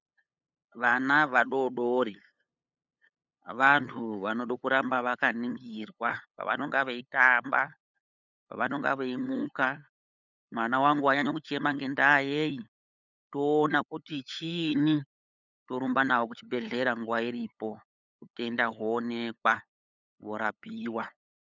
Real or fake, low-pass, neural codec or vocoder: fake; 7.2 kHz; codec, 16 kHz, 8 kbps, FunCodec, trained on LibriTTS, 25 frames a second